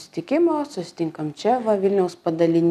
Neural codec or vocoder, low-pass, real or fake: none; 14.4 kHz; real